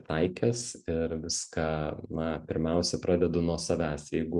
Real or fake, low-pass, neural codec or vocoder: real; 10.8 kHz; none